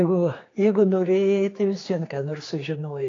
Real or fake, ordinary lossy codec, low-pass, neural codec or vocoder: fake; AAC, 32 kbps; 7.2 kHz; codec, 16 kHz, 4 kbps, X-Codec, HuBERT features, trained on general audio